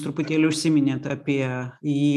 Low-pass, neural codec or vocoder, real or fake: 14.4 kHz; none; real